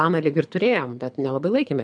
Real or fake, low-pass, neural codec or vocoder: fake; 9.9 kHz; codec, 24 kHz, 6 kbps, HILCodec